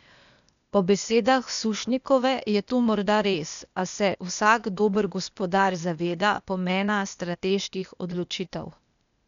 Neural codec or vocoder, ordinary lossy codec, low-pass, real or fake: codec, 16 kHz, 0.8 kbps, ZipCodec; none; 7.2 kHz; fake